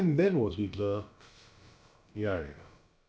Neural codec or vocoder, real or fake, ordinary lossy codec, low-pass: codec, 16 kHz, about 1 kbps, DyCAST, with the encoder's durations; fake; none; none